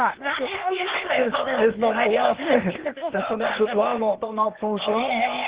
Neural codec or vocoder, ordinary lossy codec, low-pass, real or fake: codec, 16 kHz, 0.8 kbps, ZipCodec; Opus, 16 kbps; 3.6 kHz; fake